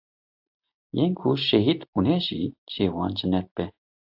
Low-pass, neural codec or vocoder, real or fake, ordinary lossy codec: 5.4 kHz; none; real; AAC, 48 kbps